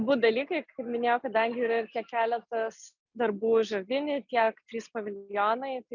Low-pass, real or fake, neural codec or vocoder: 7.2 kHz; real; none